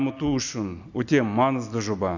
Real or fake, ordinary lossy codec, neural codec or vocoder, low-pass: real; none; none; 7.2 kHz